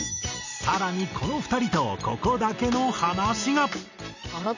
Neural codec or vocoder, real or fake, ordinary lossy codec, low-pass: none; real; none; 7.2 kHz